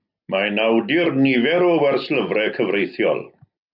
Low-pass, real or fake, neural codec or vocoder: 5.4 kHz; real; none